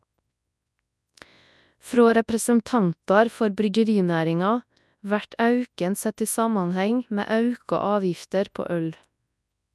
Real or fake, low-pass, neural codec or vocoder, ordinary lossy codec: fake; none; codec, 24 kHz, 0.9 kbps, WavTokenizer, large speech release; none